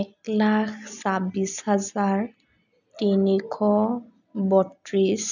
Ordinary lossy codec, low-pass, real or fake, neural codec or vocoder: none; 7.2 kHz; real; none